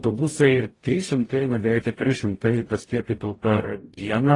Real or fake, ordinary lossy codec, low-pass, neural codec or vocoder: fake; AAC, 32 kbps; 10.8 kHz; codec, 44.1 kHz, 0.9 kbps, DAC